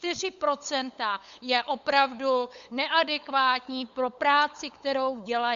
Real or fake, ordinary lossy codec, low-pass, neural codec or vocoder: fake; Opus, 64 kbps; 7.2 kHz; codec, 16 kHz, 8 kbps, FunCodec, trained on LibriTTS, 25 frames a second